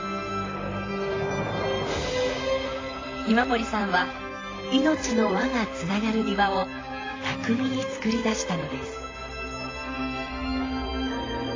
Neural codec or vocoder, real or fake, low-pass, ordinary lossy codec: vocoder, 44.1 kHz, 128 mel bands, Pupu-Vocoder; fake; 7.2 kHz; AAC, 32 kbps